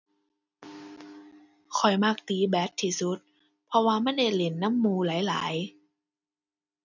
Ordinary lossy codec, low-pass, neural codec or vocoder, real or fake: none; 7.2 kHz; none; real